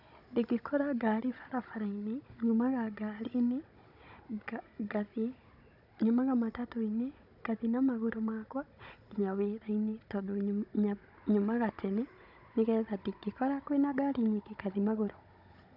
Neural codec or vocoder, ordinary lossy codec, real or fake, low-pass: codec, 16 kHz, 16 kbps, FunCodec, trained on Chinese and English, 50 frames a second; none; fake; 5.4 kHz